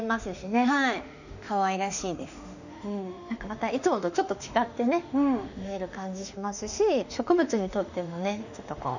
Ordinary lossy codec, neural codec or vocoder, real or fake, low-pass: none; autoencoder, 48 kHz, 32 numbers a frame, DAC-VAE, trained on Japanese speech; fake; 7.2 kHz